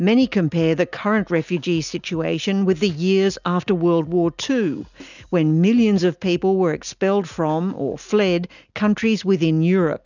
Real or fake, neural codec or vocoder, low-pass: real; none; 7.2 kHz